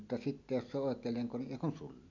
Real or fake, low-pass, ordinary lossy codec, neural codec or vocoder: real; 7.2 kHz; MP3, 64 kbps; none